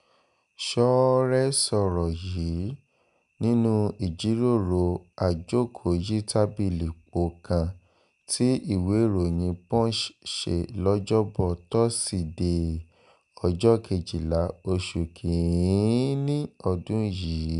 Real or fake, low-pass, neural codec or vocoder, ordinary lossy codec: real; 10.8 kHz; none; none